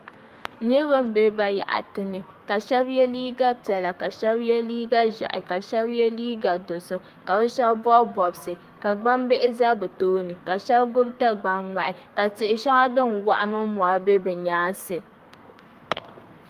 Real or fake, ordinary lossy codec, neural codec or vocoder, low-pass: fake; Opus, 32 kbps; codec, 32 kHz, 1.9 kbps, SNAC; 14.4 kHz